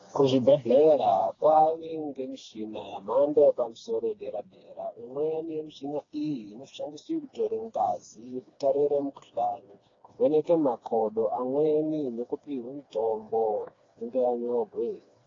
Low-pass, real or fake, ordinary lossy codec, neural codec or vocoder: 7.2 kHz; fake; AAC, 32 kbps; codec, 16 kHz, 2 kbps, FreqCodec, smaller model